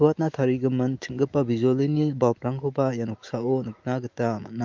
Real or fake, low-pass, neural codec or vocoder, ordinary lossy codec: fake; 7.2 kHz; autoencoder, 48 kHz, 128 numbers a frame, DAC-VAE, trained on Japanese speech; Opus, 24 kbps